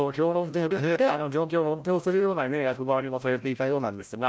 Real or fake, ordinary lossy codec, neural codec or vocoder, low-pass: fake; none; codec, 16 kHz, 0.5 kbps, FreqCodec, larger model; none